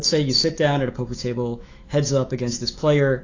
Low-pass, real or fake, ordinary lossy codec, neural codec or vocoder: 7.2 kHz; fake; AAC, 32 kbps; autoencoder, 48 kHz, 128 numbers a frame, DAC-VAE, trained on Japanese speech